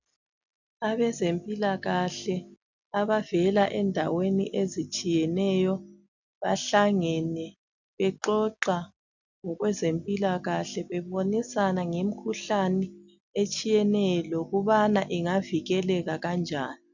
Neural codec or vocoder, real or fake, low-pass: none; real; 7.2 kHz